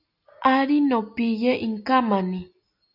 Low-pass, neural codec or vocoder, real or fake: 5.4 kHz; none; real